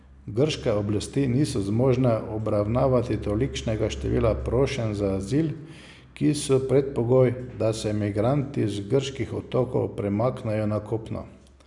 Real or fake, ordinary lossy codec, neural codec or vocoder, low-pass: real; none; none; 10.8 kHz